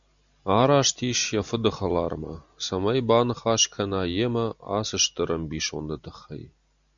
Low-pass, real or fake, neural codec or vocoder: 7.2 kHz; real; none